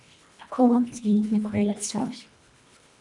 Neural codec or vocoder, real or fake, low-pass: codec, 24 kHz, 1.5 kbps, HILCodec; fake; 10.8 kHz